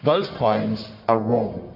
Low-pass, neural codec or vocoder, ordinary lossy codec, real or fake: 5.4 kHz; codec, 44.1 kHz, 1.7 kbps, Pupu-Codec; MP3, 48 kbps; fake